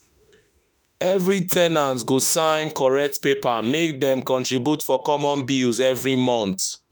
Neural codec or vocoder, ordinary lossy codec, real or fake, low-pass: autoencoder, 48 kHz, 32 numbers a frame, DAC-VAE, trained on Japanese speech; none; fake; none